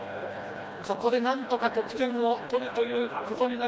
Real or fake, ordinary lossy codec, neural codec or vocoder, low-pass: fake; none; codec, 16 kHz, 1 kbps, FreqCodec, smaller model; none